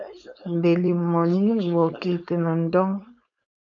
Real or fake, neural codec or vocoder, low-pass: fake; codec, 16 kHz, 4.8 kbps, FACodec; 7.2 kHz